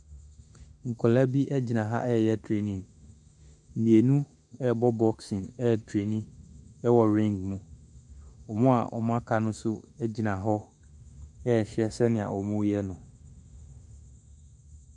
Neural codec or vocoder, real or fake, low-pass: autoencoder, 48 kHz, 32 numbers a frame, DAC-VAE, trained on Japanese speech; fake; 10.8 kHz